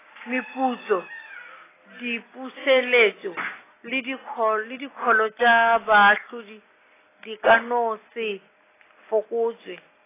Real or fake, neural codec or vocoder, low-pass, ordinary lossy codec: real; none; 3.6 kHz; AAC, 16 kbps